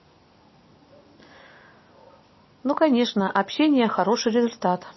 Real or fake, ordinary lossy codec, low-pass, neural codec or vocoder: real; MP3, 24 kbps; 7.2 kHz; none